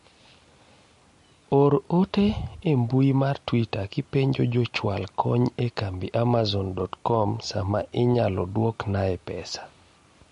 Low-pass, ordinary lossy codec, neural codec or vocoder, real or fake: 10.8 kHz; MP3, 48 kbps; none; real